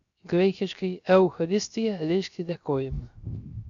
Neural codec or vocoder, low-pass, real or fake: codec, 16 kHz, 0.3 kbps, FocalCodec; 7.2 kHz; fake